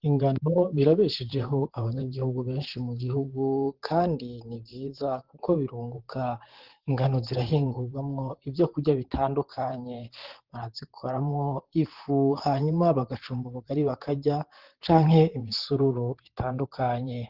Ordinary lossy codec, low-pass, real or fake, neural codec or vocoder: Opus, 16 kbps; 5.4 kHz; fake; vocoder, 44.1 kHz, 128 mel bands, Pupu-Vocoder